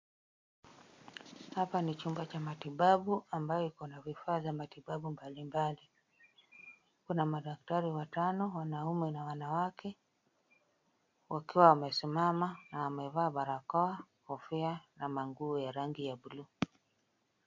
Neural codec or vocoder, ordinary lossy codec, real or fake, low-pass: none; MP3, 48 kbps; real; 7.2 kHz